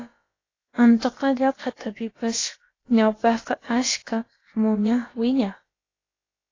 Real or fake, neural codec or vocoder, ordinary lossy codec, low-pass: fake; codec, 16 kHz, about 1 kbps, DyCAST, with the encoder's durations; AAC, 32 kbps; 7.2 kHz